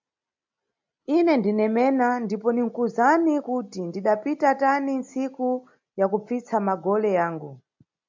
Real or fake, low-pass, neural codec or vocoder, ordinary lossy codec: real; 7.2 kHz; none; MP3, 64 kbps